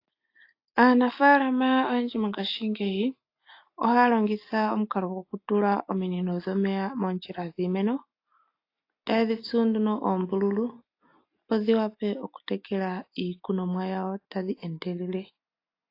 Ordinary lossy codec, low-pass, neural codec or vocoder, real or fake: AAC, 32 kbps; 5.4 kHz; none; real